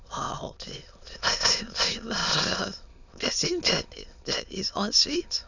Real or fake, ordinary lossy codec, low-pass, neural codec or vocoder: fake; none; 7.2 kHz; autoencoder, 22.05 kHz, a latent of 192 numbers a frame, VITS, trained on many speakers